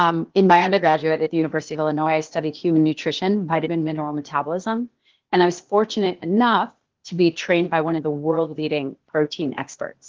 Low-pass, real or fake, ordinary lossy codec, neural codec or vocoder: 7.2 kHz; fake; Opus, 16 kbps; codec, 16 kHz, 0.8 kbps, ZipCodec